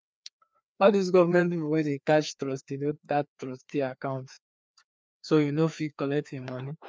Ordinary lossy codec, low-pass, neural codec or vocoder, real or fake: none; none; codec, 16 kHz, 2 kbps, FreqCodec, larger model; fake